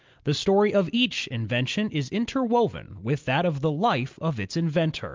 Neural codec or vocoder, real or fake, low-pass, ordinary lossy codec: none; real; 7.2 kHz; Opus, 24 kbps